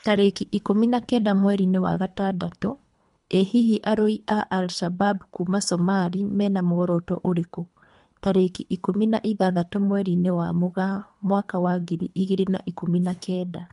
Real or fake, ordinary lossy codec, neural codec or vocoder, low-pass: fake; MP3, 64 kbps; codec, 24 kHz, 3 kbps, HILCodec; 10.8 kHz